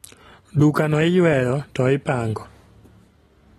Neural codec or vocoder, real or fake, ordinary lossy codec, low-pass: none; real; AAC, 32 kbps; 19.8 kHz